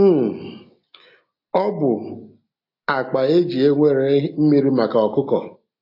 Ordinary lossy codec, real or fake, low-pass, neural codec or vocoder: AAC, 32 kbps; real; 5.4 kHz; none